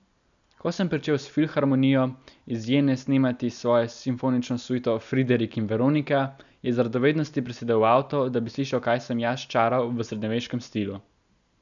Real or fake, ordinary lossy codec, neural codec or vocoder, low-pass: real; none; none; 7.2 kHz